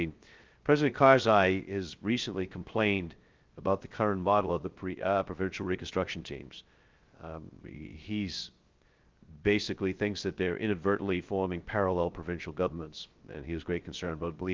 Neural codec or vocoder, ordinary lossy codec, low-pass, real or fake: codec, 16 kHz, 0.3 kbps, FocalCodec; Opus, 32 kbps; 7.2 kHz; fake